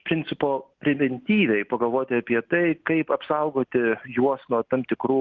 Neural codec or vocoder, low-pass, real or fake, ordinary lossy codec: none; 7.2 kHz; real; Opus, 16 kbps